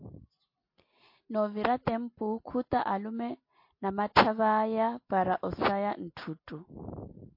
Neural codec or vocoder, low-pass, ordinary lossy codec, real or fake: none; 5.4 kHz; MP3, 32 kbps; real